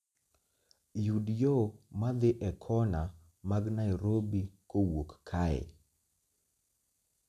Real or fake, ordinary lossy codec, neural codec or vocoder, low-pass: real; none; none; 14.4 kHz